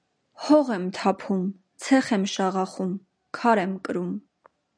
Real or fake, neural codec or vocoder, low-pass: real; none; 9.9 kHz